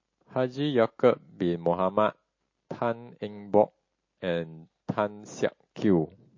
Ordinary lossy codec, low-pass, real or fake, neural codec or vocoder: MP3, 32 kbps; 7.2 kHz; real; none